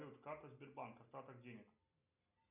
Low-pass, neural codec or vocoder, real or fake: 3.6 kHz; none; real